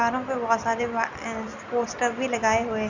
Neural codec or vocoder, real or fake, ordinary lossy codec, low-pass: none; real; none; 7.2 kHz